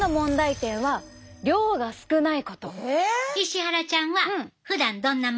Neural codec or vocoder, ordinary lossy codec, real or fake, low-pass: none; none; real; none